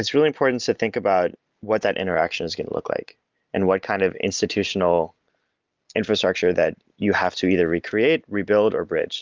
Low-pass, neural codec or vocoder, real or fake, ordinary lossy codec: 7.2 kHz; none; real; Opus, 24 kbps